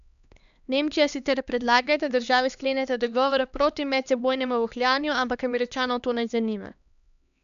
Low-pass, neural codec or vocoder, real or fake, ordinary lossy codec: 7.2 kHz; codec, 16 kHz, 2 kbps, X-Codec, HuBERT features, trained on LibriSpeech; fake; none